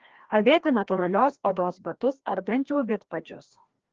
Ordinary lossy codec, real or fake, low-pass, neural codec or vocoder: Opus, 16 kbps; fake; 7.2 kHz; codec, 16 kHz, 1 kbps, FreqCodec, larger model